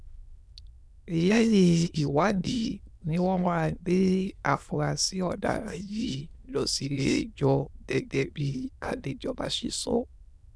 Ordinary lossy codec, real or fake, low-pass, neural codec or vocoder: none; fake; none; autoencoder, 22.05 kHz, a latent of 192 numbers a frame, VITS, trained on many speakers